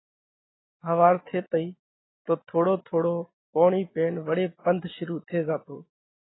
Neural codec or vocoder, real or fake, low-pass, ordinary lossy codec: none; real; 7.2 kHz; AAC, 16 kbps